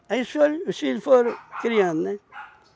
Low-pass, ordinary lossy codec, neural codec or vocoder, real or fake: none; none; none; real